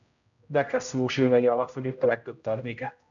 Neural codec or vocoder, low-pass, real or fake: codec, 16 kHz, 0.5 kbps, X-Codec, HuBERT features, trained on general audio; 7.2 kHz; fake